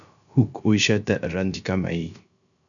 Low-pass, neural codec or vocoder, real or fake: 7.2 kHz; codec, 16 kHz, 0.3 kbps, FocalCodec; fake